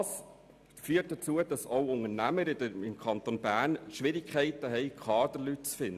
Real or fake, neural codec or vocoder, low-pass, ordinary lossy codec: real; none; 14.4 kHz; none